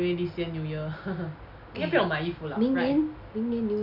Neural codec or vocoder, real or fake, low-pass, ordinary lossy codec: none; real; 5.4 kHz; none